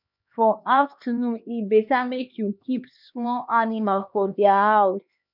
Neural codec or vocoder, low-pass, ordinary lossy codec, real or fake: codec, 16 kHz, 2 kbps, X-Codec, HuBERT features, trained on LibriSpeech; 5.4 kHz; none; fake